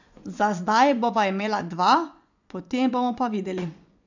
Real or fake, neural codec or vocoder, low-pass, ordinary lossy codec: fake; vocoder, 24 kHz, 100 mel bands, Vocos; 7.2 kHz; none